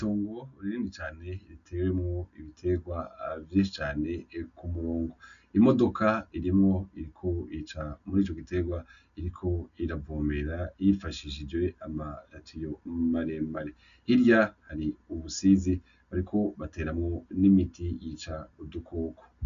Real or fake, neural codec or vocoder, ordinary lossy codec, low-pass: real; none; MP3, 96 kbps; 7.2 kHz